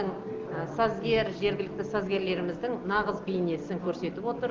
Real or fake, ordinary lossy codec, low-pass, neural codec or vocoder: real; Opus, 16 kbps; 7.2 kHz; none